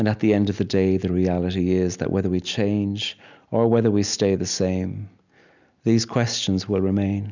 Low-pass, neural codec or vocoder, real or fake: 7.2 kHz; none; real